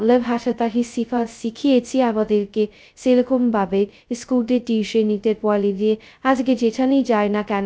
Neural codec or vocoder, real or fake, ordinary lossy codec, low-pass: codec, 16 kHz, 0.2 kbps, FocalCodec; fake; none; none